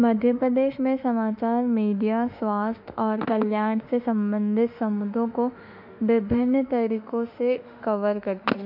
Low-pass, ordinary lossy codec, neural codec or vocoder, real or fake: 5.4 kHz; none; autoencoder, 48 kHz, 32 numbers a frame, DAC-VAE, trained on Japanese speech; fake